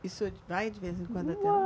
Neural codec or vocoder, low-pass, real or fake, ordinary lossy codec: none; none; real; none